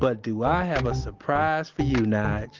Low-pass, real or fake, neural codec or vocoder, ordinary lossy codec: 7.2 kHz; real; none; Opus, 16 kbps